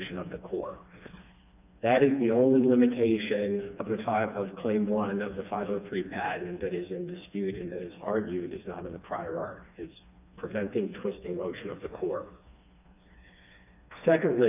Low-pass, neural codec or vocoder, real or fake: 3.6 kHz; codec, 16 kHz, 2 kbps, FreqCodec, smaller model; fake